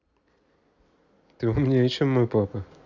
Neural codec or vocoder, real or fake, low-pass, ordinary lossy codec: none; real; 7.2 kHz; none